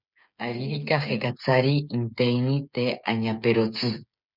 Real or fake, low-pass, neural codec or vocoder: fake; 5.4 kHz; codec, 16 kHz, 8 kbps, FreqCodec, smaller model